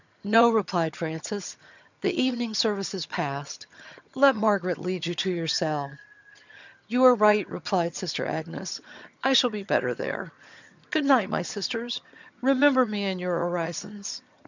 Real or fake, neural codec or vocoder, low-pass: fake; vocoder, 22.05 kHz, 80 mel bands, HiFi-GAN; 7.2 kHz